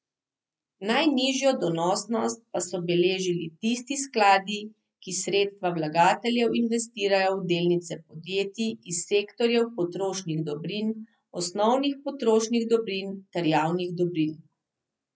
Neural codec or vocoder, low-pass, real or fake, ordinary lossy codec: none; none; real; none